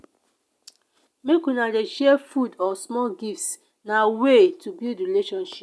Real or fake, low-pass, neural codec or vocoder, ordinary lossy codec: real; none; none; none